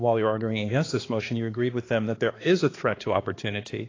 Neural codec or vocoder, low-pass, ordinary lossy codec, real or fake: codec, 16 kHz, 2 kbps, X-Codec, HuBERT features, trained on balanced general audio; 7.2 kHz; AAC, 32 kbps; fake